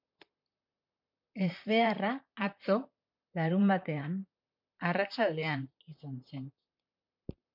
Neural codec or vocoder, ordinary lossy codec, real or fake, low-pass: vocoder, 44.1 kHz, 128 mel bands, Pupu-Vocoder; MP3, 48 kbps; fake; 5.4 kHz